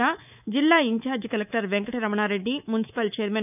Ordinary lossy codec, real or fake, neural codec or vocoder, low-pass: none; fake; codec, 24 kHz, 3.1 kbps, DualCodec; 3.6 kHz